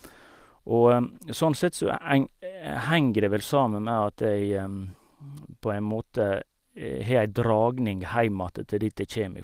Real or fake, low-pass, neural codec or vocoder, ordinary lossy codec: real; 14.4 kHz; none; Opus, 24 kbps